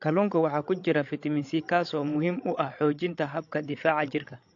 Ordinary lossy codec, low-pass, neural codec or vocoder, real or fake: AAC, 48 kbps; 7.2 kHz; codec, 16 kHz, 16 kbps, FreqCodec, larger model; fake